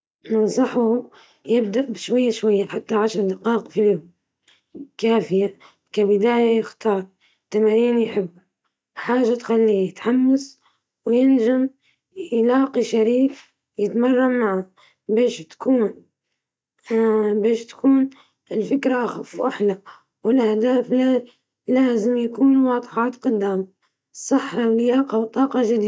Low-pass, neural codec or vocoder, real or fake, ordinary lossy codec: none; none; real; none